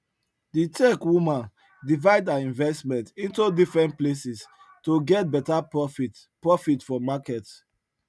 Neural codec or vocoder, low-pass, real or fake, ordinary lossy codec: none; none; real; none